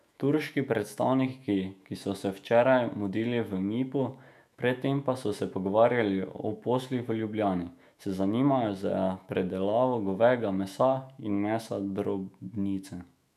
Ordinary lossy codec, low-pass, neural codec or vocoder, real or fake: none; 14.4 kHz; autoencoder, 48 kHz, 128 numbers a frame, DAC-VAE, trained on Japanese speech; fake